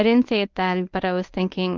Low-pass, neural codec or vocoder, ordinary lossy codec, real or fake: 7.2 kHz; codec, 24 kHz, 0.9 kbps, WavTokenizer, medium speech release version 1; Opus, 24 kbps; fake